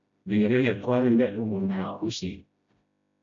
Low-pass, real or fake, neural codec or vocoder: 7.2 kHz; fake; codec, 16 kHz, 0.5 kbps, FreqCodec, smaller model